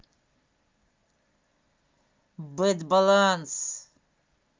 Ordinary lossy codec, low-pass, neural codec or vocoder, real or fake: Opus, 24 kbps; 7.2 kHz; none; real